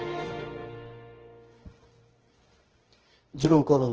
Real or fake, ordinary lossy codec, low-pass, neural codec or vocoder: fake; Opus, 16 kbps; 7.2 kHz; codec, 24 kHz, 0.9 kbps, WavTokenizer, medium music audio release